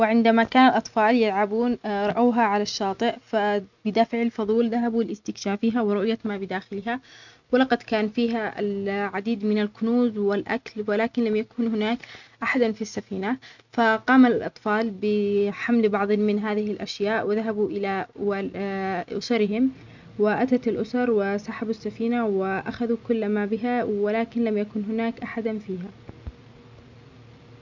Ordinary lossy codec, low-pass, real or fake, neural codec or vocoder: none; 7.2 kHz; real; none